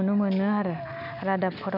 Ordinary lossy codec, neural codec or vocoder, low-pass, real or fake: none; none; 5.4 kHz; real